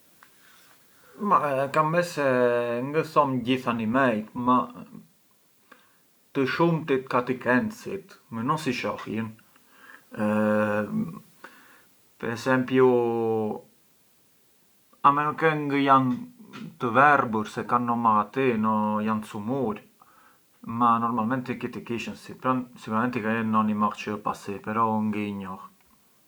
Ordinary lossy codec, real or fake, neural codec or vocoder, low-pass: none; real; none; none